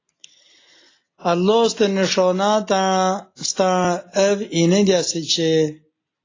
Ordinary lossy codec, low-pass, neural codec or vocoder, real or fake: AAC, 32 kbps; 7.2 kHz; none; real